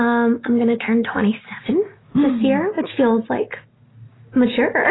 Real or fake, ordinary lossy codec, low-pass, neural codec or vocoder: real; AAC, 16 kbps; 7.2 kHz; none